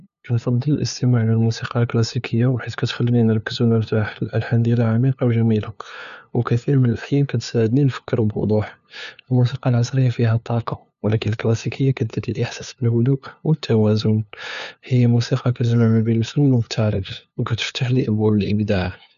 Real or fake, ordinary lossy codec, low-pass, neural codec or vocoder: fake; none; 7.2 kHz; codec, 16 kHz, 2 kbps, FunCodec, trained on LibriTTS, 25 frames a second